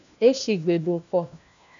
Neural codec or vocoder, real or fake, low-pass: codec, 16 kHz, 1 kbps, FunCodec, trained on LibriTTS, 50 frames a second; fake; 7.2 kHz